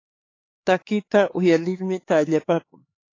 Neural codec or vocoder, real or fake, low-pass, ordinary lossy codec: codec, 16 kHz, 4 kbps, X-Codec, HuBERT features, trained on LibriSpeech; fake; 7.2 kHz; AAC, 32 kbps